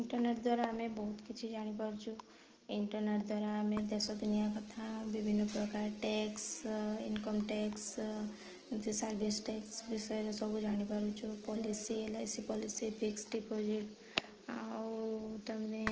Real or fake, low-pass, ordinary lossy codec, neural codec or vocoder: real; 7.2 kHz; Opus, 16 kbps; none